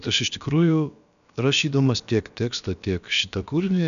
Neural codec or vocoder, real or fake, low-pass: codec, 16 kHz, about 1 kbps, DyCAST, with the encoder's durations; fake; 7.2 kHz